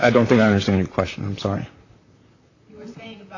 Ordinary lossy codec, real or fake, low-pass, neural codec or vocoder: AAC, 48 kbps; fake; 7.2 kHz; vocoder, 44.1 kHz, 128 mel bands, Pupu-Vocoder